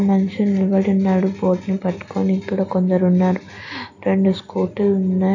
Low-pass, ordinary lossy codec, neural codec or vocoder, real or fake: 7.2 kHz; AAC, 48 kbps; none; real